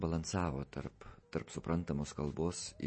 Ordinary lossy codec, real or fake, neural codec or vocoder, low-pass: MP3, 32 kbps; real; none; 9.9 kHz